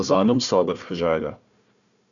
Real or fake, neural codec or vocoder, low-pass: fake; codec, 16 kHz, 1 kbps, FunCodec, trained on Chinese and English, 50 frames a second; 7.2 kHz